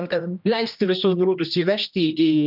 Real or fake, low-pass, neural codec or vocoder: fake; 5.4 kHz; codec, 16 kHz, 1 kbps, X-Codec, HuBERT features, trained on general audio